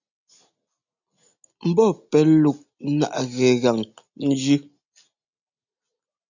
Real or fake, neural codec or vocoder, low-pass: real; none; 7.2 kHz